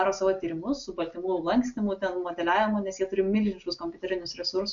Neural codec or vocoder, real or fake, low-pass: none; real; 7.2 kHz